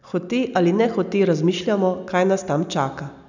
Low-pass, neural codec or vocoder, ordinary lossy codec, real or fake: 7.2 kHz; none; none; real